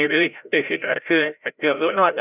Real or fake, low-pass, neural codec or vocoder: fake; 3.6 kHz; codec, 16 kHz, 0.5 kbps, FreqCodec, larger model